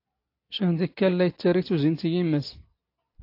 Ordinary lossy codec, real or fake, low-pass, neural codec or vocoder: AAC, 32 kbps; real; 5.4 kHz; none